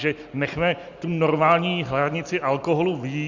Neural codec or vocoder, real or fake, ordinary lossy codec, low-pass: none; real; Opus, 64 kbps; 7.2 kHz